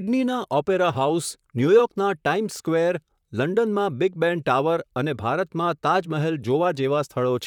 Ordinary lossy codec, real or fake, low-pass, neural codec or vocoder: none; fake; 19.8 kHz; vocoder, 44.1 kHz, 128 mel bands, Pupu-Vocoder